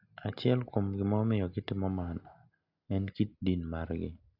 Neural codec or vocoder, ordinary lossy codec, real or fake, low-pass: none; AAC, 48 kbps; real; 5.4 kHz